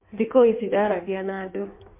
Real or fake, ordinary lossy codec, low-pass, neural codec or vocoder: fake; MP3, 24 kbps; 3.6 kHz; codec, 16 kHz in and 24 kHz out, 1.1 kbps, FireRedTTS-2 codec